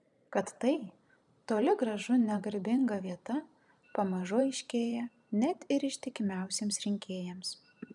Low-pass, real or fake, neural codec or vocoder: 10.8 kHz; fake; vocoder, 44.1 kHz, 128 mel bands every 512 samples, BigVGAN v2